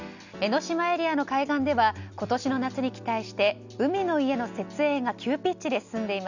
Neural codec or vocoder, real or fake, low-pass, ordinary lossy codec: none; real; 7.2 kHz; none